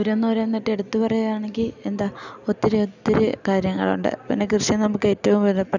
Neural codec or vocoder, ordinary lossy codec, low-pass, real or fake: none; none; 7.2 kHz; real